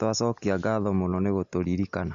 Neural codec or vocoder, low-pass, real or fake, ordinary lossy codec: none; 7.2 kHz; real; MP3, 48 kbps